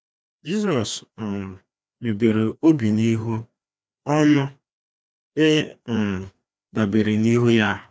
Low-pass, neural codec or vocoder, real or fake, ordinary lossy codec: none; codec, 16 kHz, 2 kbps, FreqCodec, larger model; fake; none